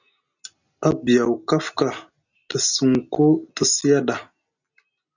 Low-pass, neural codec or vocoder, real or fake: 7.2 kHz; none; real